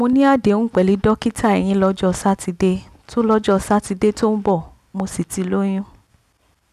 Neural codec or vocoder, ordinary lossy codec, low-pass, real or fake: none; none; 14.4 kHz; real